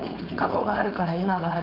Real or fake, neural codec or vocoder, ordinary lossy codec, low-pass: fake; codec, 16 kHz, 4.8 kbps, FACodec; none; 5.4 kHz